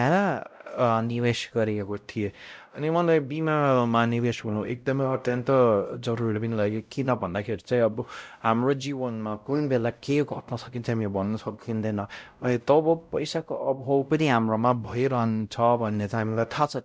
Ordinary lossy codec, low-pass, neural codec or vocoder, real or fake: none; none; codec, 16 kHz, 0.5 kbps, X-Codec, WavLM features, trained on Multilingual LibriSpeech; fake